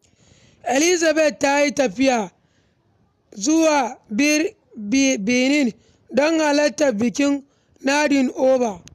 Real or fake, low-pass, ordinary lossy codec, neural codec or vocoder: real; 14.4 kHz; none; none